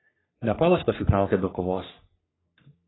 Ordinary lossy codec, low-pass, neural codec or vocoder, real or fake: AAC, 16 kbps; 7.2 kHz; codec, 44.1 kHz, 3.4 kbps, Pupu-Codec; fake